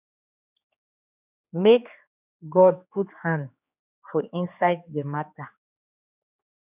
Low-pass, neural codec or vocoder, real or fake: 3.6 kHz; codec, 16 kHz, 4 kbps, X-Codec, HuBERT features, trained on general audio; fake